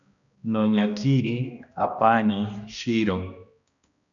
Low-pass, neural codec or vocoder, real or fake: 7.2 kHz; codec, 16 kHz, 1 kbps, X-Codec, HuBERT features, trained on balanced general audio; fake